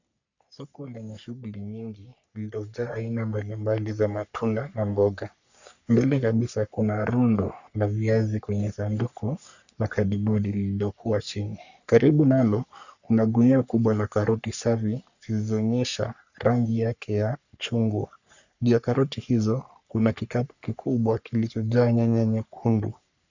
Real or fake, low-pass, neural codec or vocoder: fake; 7.2 kHz; codec, 44.1 kHz, 3.4 kbps, Pupu-Codec